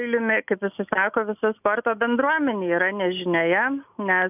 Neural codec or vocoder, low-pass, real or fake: none; 3.6 kHz; real